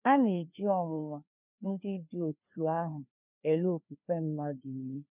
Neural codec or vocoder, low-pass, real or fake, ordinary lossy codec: codec, 16 kHz, 2 kbps, FreqCodec, larger model; 3.6 kHz; fake; none